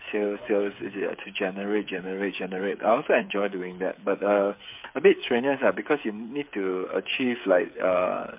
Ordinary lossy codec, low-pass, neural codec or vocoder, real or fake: MP3, 32 kbps; 3.6 kHz; codec, 16 kHz, 8 kbps, FreqCodec, smaller model; fake